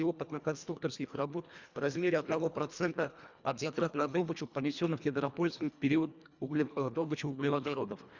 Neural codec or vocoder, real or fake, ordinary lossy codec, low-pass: codec, 24 kHz, 1.5 kbps, HILCodec; fake; Opus, 64 kbps; 7.2 kHz